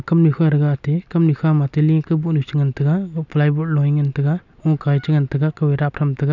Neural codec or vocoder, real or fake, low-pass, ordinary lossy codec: none; real; 7.2 kHz; none